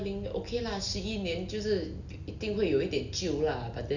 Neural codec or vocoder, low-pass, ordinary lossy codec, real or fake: none; 7.2 kHz; none; real